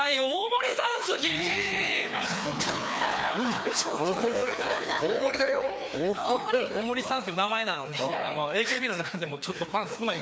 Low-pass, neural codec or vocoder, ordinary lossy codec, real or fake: none; codec, 16 kHz, 2 kbps, FreqCodec, larger model; none; fake